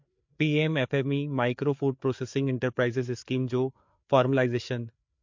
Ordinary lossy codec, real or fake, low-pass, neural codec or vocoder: MP3, 48 kbps; fake; 7.2 kHz; codec, 16 kHz, 4 kbps, FreqCodec, larger model